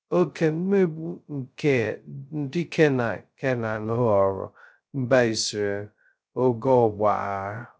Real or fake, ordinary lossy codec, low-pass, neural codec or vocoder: fake; none; none; codec, 16 kHz, 0.2 kbps, FocalCodec